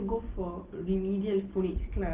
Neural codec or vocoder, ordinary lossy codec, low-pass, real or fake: none; Opus, 16 kbps; 3.6 kHz; real